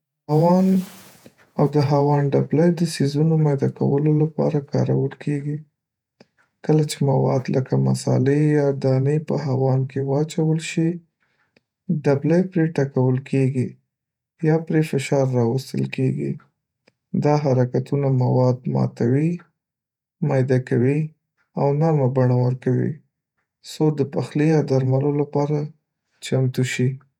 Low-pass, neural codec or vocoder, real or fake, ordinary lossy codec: 19.8 kHz; vocoder, 48 kHz, 128 mel bands, Vocos; fake; none